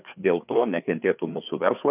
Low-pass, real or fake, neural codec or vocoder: 3.6 kHz; fake; codec, 16 kHz, 4 kbps, FreqCodec, larger model